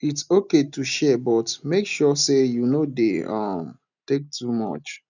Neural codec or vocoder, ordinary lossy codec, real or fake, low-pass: none; none; real; 7.2 kHz